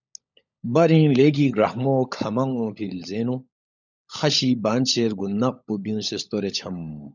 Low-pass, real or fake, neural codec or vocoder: 7.2 kHz; fake; codec, 16 kHz, 16 kbps, FunCodec, trained on LibriTTS, 50 frames a second